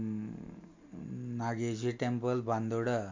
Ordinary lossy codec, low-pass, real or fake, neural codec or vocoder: MP3, 48 kbps; 7.2 kHz; real; none